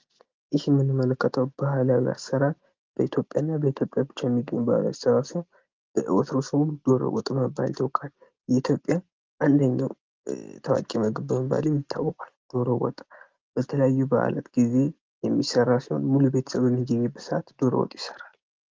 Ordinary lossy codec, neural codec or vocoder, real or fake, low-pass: Opus, 32 kbps; none; real; 7.2 kHz